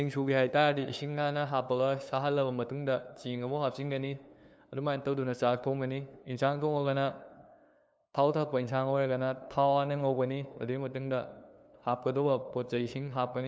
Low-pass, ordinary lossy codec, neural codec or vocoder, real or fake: none; none; codec, 16 kHz, 2 kbps, FunCodec, trained on LibriTTS, 25 frames a second; fake